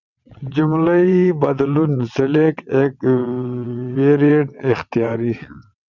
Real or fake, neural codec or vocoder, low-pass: fake; vocoder, 22.05 kHz, 80 mel bands, WaveNeXt; 7.2 kHz